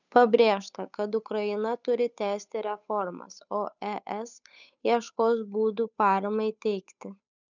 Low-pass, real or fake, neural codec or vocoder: 7.2 kHz; fake; codec, 16 kHz, 8 kbps, FunCodec, trained on Chinese and English, 25 frames a second